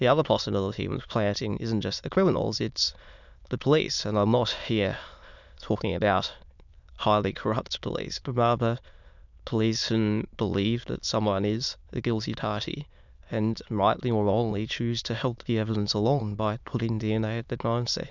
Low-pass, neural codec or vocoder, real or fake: 7.2 kHz; autoencoder, 22.05 kHz, a latent of 192 numbers a frame, VITS, trained on many speakers; fake